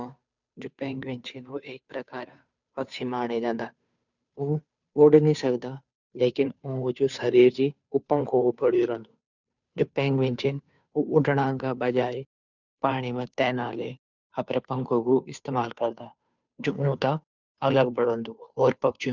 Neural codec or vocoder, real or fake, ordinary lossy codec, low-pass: codec, 16 kHz, 2 kbps, FunCodec, trained on Chinese and English, 25 frames a second; fake; none; 7.2 kHz